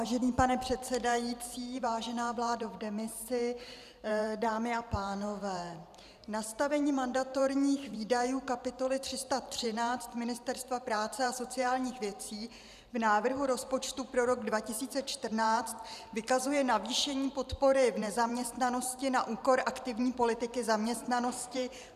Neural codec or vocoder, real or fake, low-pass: vocoder, 44.1 kHz, 128 mel bands every 512 samples, BigVGAN v2; fake; 14.4 kHz